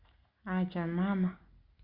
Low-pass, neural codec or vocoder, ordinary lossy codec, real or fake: 5.4 kHz; none; Opus, 64 kbps; real